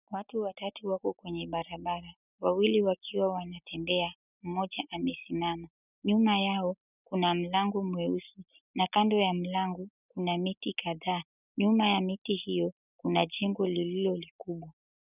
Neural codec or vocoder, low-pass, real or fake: none; 3.6 kHz; real